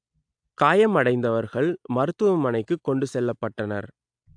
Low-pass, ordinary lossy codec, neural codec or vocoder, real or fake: 9.9 kHz; AAC, 64 kbps; none; real